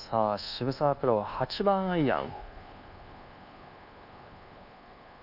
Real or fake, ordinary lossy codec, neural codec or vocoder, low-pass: fake; none; codec, 24 kHz, 1.2 kbps, DualCodec; 5.4 kHz